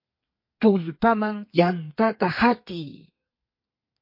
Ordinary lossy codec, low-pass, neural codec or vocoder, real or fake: MP3, 32 kbps; 5.4 kHz; codec, 32 kHz, 1.9 kbps, SNAC; fake